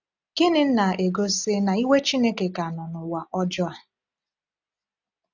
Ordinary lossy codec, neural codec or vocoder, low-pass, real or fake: none; none; 7.2 kHz; real